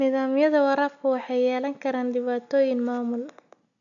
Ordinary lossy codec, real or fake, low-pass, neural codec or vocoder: none; real; 7.2 kHz; none